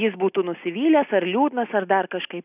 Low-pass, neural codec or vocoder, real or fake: 3.6 kHz; none; real